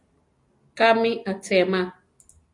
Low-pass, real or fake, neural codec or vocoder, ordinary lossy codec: 10.8 kHz; real; none; AAC, 64 kbps